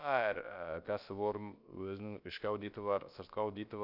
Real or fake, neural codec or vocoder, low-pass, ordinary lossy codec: fake; codec, 16 kHz, about 1 kbps, DyCAST, with the encoder's durations; 5.4 kHz; none